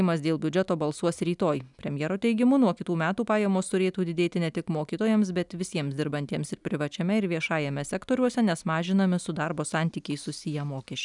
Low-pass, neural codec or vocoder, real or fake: 10.8 kHz; none; real